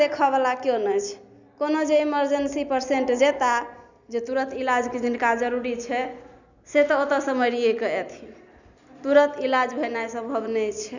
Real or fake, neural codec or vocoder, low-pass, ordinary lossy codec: real; none; 7.2 kHz; none